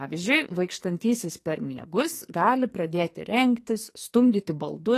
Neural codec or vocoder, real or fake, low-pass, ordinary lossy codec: codec, 44.1 kHz, 2.6 kbps, SNAC; fake; 14.4 kHz; AAC, 48 kbps